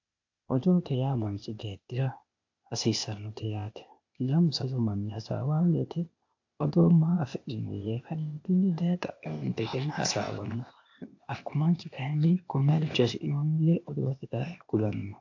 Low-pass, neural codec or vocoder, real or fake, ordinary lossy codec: 7.2 kHz; codec, 16 kHz, 0.8 kbps, ZipCodec; fake; AAC, 48 kbps